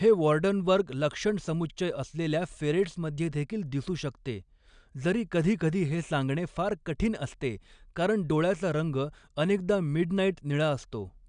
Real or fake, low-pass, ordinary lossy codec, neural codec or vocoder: real; 9.9 kHz; none; none